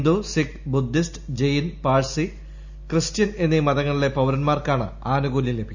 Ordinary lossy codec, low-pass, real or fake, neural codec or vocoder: none; 7.2 kHz; real; none